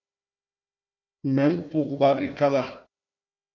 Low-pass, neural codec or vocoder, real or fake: 7.2 kHz; codec, 16 kHz, 1 kbps, FunCodec, trained on Chinese and English, 50 frames a second; fake